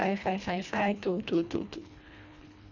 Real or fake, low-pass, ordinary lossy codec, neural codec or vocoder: fake; 7.2 kHz; none; codec, 24 kHz, 1.5 kbps, HILCodec